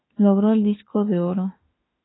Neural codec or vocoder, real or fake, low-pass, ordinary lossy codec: autoencoder, 48 kHz, 32 numbers a frame, DAC-VAE, trained on Japanese speech; fake; 7.2 kHz; AAC, 16 kbps